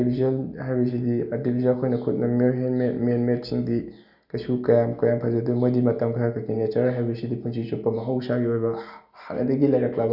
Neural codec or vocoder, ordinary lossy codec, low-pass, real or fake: none; none; 5.4 kHz; real